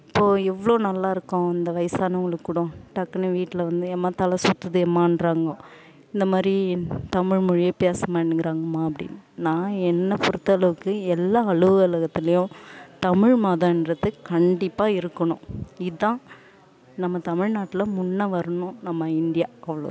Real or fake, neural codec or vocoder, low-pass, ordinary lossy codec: real; none; none; none